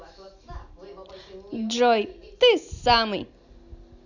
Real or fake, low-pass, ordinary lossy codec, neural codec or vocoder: real; 7.2 kHz; none; none